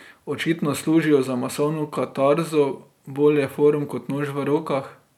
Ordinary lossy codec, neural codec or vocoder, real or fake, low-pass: none; none; real; 19.8 kHz